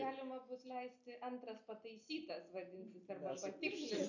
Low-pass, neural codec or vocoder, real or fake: 7.2 kHz; none; real